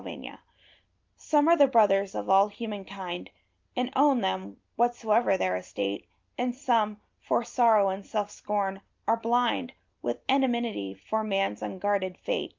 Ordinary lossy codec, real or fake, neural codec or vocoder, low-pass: Opus, 24 kbps; real; none; 7.2 kHz